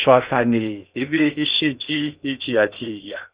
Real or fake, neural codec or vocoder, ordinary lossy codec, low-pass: fake; codec, 16 kHz in and 24 kHz out, 0.6 kbps, FocalCodec, streaming, 2048 codes; Opus, 64 kbps; 3.6 kHz